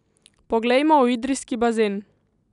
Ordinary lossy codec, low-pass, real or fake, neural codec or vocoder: none; 10.8 kHz; real; none